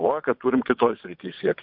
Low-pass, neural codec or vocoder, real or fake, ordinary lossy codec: 5.4 kHz; vocoder, 22.05 kHz, 80 mel bands, WaveNeXt; fake; MP3, 48 kbps